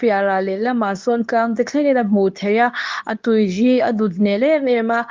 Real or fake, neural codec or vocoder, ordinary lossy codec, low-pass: fake; codec, 24 kHz, 0.9 kbps, WavTokenizer, medium speech release version 2; Opus, 32 kbps; 7.2 kHz